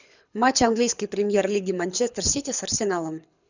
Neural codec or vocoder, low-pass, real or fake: codec, 24 kHz, 6 kbps, HILCodec; 7.2 kHz; fake